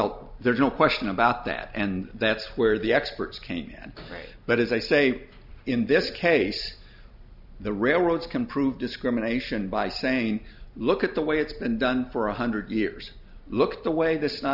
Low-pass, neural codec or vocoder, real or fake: 5.4 kHz; none; real